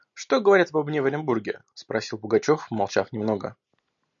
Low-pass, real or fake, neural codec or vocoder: 7.2 kHz; real; none